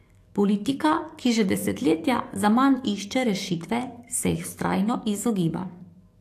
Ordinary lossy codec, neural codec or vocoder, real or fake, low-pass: AAC, 64 kbps; codec, 44.1 kHz, 7.8 kbps, DAC; fake; 14.4 kHz